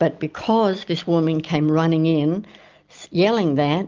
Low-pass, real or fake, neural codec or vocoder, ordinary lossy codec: 7.2 kHz; real; none; Opus, 24 kbps